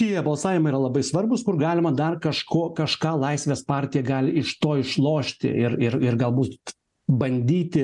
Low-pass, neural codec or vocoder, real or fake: 10.8 kHz; none; real